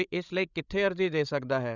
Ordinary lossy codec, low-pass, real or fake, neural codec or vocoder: none; 7.2 kHz; fake; codec, 16 kHz, 16 kbps, FreqCodec, larger model